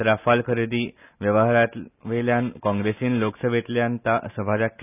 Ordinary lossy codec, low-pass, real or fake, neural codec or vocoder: none; 3.6 kHz; real; none